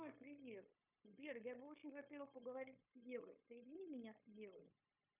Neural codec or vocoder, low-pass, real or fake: codec, 16 kHz, 0.9 kbps, LongCat-Audio-Codec; 3.6 kHz; fake